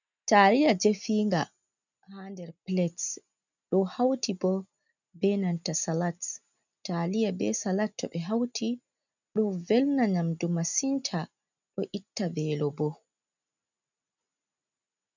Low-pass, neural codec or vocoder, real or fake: 7.2 kHz; none; real